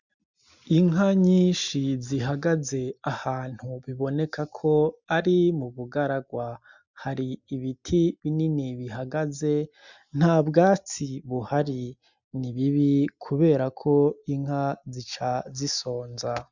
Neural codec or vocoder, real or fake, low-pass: none; real; 7.2 kHz